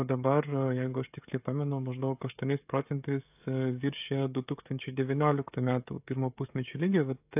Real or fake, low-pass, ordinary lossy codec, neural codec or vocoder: fake; 3.6 kHz; AAC, 32 kbps; codec, 16 kHz, 16 kbps, FreqCodec, smaller model